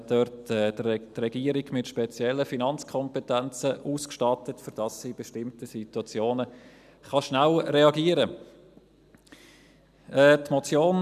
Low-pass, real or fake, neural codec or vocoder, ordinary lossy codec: 14.4 kHz; real; none; none